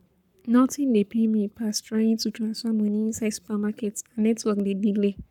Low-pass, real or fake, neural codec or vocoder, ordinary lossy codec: 19.8 kHz; fake; codec, 44.1 kHz, 7.8 kbps, Pupu-Codec; none